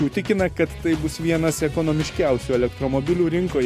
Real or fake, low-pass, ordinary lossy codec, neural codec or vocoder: real; 14.4 kHz; MP3, 64 kbps; none